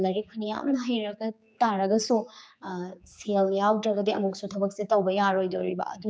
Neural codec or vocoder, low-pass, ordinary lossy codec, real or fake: codec, 16 kHz, 4 kbps, X-Codec, HuBERT features, trained on general audio; none; none; fake